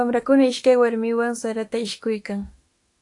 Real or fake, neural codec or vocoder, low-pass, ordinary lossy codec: fake; autoencoder, 48 kHz, 32 numbers a frame, DAC-VAE, trained on Japanese speech; 10.8 kHz; AAC, 48 kbps